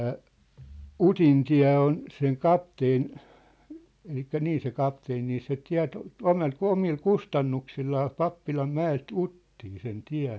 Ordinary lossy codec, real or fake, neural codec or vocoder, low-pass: none; real; none; none